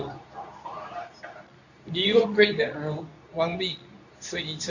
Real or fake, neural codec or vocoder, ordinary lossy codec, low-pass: fake; codec, 24 kHz, 0.9 kbps, WavTokenizer, medium speech release version 2; none; 7.2 kHz